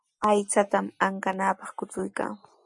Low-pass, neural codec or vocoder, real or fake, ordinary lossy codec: 10.8 kHz; none; real; MP3, 64 kbps